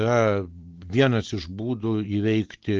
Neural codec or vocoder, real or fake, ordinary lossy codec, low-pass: none; real; Opus, 16 kbps; 7.2 kHz